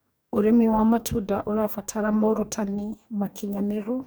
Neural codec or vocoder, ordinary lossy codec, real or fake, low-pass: codec, 44.1 kHz, 2.6 kbps, DAC; none; fake; none